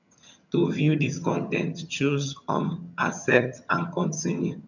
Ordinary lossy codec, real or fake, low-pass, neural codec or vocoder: none; fake; 7.2 kHz; vocoder, 22.05 kHz, 80 mel bands, HiFi-GAN